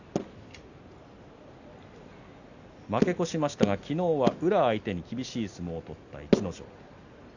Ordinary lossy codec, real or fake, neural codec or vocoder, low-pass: none; real; none; 7.2 kHz